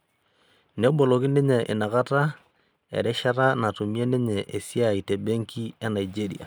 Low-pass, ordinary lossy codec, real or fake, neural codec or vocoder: none; none; real; none